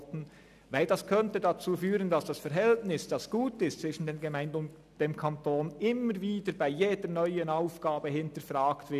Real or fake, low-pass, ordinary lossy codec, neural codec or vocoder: real; 14.4 kHz; none; none